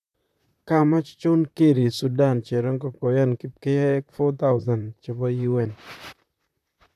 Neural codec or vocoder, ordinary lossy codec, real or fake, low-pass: vocoder, 44.1 kHz, 128 mel bands, Pupu-Vocoder; none; fake; 14.4 kHz